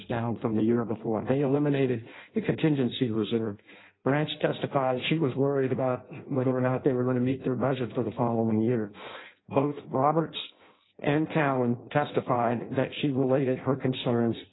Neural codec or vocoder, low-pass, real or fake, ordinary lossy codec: codec, 16 kHz in and 24 kHz out, 0.6 kbps, FireRedTTS-2 codec; 7.2 kHz; fake; AAC, 16 kbps